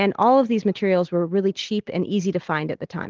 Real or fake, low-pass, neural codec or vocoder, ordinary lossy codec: real; 7.2 kHz; none; Opus, 16 kbps